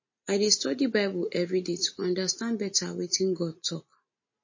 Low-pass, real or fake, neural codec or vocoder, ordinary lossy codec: 7.2 kHz; real; none; MP3, 32 kbps